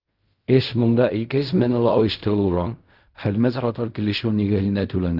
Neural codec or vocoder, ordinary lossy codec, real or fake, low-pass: codec, 16 kHz in and 24 kHz out, 0.4 kbps, LongCat-Audio-Codec, fine tuned four codebook decoder; Opus, 24 kbps; fake; 5.4 kHz